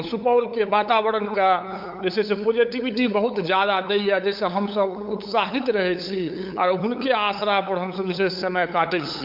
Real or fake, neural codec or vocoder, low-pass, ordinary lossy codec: fake; codec, 16 kHz, 8 kbps, FunCodec, trained on LibriTTS, 25 frames a second; 5.4 kHz; MP3, 48 kbps